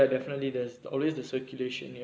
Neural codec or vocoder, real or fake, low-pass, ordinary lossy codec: none; real; none; none